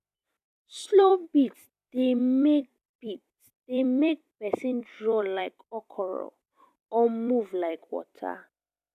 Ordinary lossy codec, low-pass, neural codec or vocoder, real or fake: none; 14.4 kHz; vocoder, 44.1 kHz, 128 mel bands every 256 samples, BigVGAN v2; fake